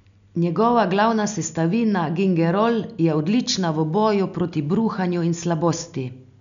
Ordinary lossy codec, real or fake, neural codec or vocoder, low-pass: none; real; none; 7.2 kHz